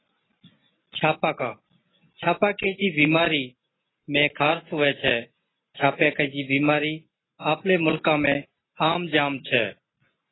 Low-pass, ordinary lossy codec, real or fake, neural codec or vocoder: 7.2 kHz; AAC, 16 kbps; real; none